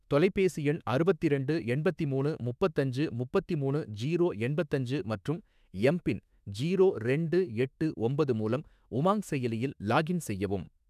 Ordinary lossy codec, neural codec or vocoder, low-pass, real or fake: none; autoencoder, 48 kHz, 32 numbers a frame, DAC-VAE, trained on Japanese speech; 14.4 kHz; fake